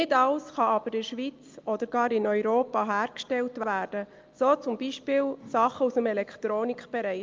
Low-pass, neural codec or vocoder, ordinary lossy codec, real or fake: 7.2 kHz; none; Opus, 24 kbps; real